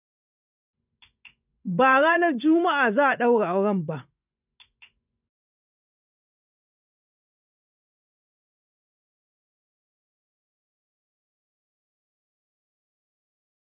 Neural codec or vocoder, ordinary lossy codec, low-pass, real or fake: vocoder, 24 kHz, 100 mel bands, Vocos; none; 3.6 kHz; fake